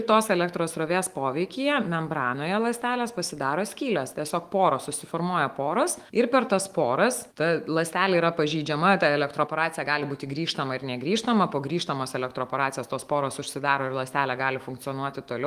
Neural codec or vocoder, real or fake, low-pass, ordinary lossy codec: autoencoder, 48 kHz, 128 numbers a frame, DAC-VAE, trained on Japanese speech; fake; 14.4 kHz; Opus, 24 kbps